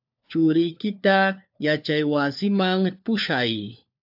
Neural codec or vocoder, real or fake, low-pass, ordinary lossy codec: codec, 16 kHz, 4 kbps, FunCodec, trained on LibriTTS, 50 frames a second; fake; 5.4 kHz; AAC, 48 kbps